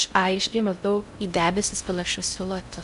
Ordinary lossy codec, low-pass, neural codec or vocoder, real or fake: MP3, 96 kbps; 10.8 kHz; codec, 16 kHz in and 24 kHz out, 0.6 kbps, FocalCodec, streaming, 2048 codes; fake